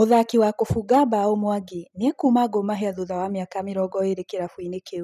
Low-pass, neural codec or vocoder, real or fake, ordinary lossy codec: 14.4 kHz; none; real; none